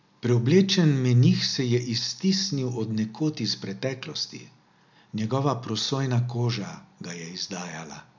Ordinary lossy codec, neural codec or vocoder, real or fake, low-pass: MP3, 64 kbps; none; real; 7.2 kHz